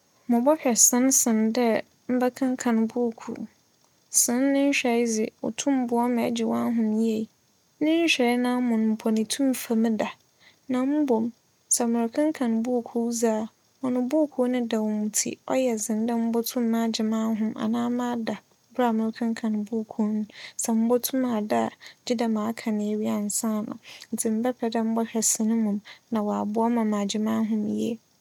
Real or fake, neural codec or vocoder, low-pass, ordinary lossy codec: real; none; 19.8 kHz; none